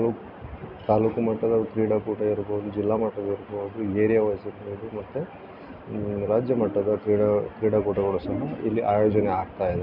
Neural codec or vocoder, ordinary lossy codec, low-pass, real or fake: none; none; 5.4 kHz; real